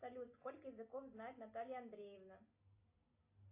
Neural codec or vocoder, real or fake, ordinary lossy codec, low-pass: none; real; AAC, 32 kbps; 3.6 kHz